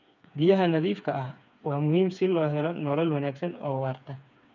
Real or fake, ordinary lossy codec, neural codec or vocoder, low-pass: fake; none; codec, 16 kHz, 4 kbps, FreqCodec, smaller model; 7.2 kHz